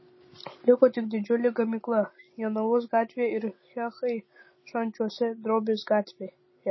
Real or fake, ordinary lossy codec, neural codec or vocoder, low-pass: real; MP3, 24 kbps; none; 7.2 kHz